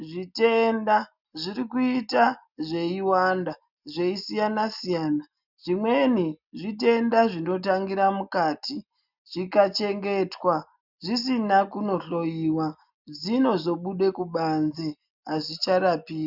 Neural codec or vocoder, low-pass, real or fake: none; 5.4 kHz; real